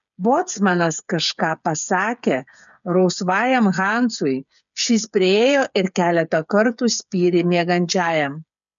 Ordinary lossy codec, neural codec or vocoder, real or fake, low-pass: MP3, 96 kbps; codec, 16 kHz, 8 kbps, FreqCodec, smaller model; fake; 7.2 kHz